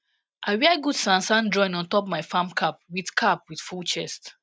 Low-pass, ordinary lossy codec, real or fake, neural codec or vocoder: none; none; real; none